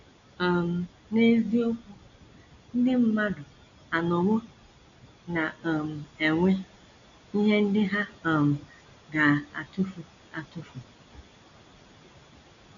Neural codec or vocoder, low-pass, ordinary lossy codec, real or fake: none; 7.2 kHz; none; real